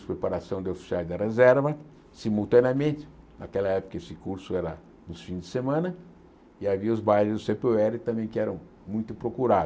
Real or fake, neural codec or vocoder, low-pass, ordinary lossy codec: real; none; none; none